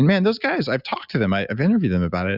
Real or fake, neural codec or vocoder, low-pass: real; none; 5.4 kHz